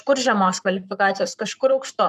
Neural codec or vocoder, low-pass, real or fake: codec, 44.1 kHz, 7.8 kbps, Pupu-Codec; 14.4 kHz; fake